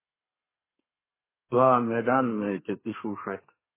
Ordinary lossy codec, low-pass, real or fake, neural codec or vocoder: MP3, 16 kbps; 3.6 kHz; fake; codec, 32 kHz, 1.9 kbps, SNAC